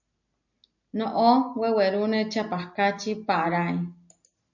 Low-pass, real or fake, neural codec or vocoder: 7.2 kHz; real; none